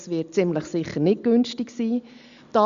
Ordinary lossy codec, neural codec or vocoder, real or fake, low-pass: Opus, 64 kbps; none; real; 7.2 kHz